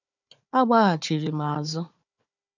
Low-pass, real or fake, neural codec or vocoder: 7.2 kHz; fake; codec, 16 kHz, 4 kbps, FunCodec, trained on Chinese and English, 50 frames a second